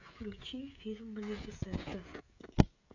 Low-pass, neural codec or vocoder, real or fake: 7.2 kHz; codec, 16 kHz, 16 kbps, FreqCodec, smaller model; fake